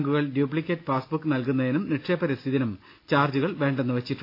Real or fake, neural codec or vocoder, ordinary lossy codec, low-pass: real; none; AAC, 32 kbps; 5.4 kHz